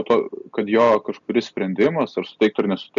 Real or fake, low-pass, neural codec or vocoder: real; 7.2 kHz; none